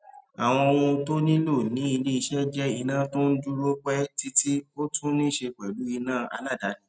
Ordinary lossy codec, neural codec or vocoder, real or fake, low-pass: none; none; real; none